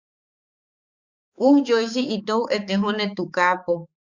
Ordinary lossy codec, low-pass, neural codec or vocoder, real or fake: Opus, 64 kbps; 7.2 kHz; codec, 16 kHz, 4 kbps, X-Codec, HuBERT features, trained on balanced general audio; fake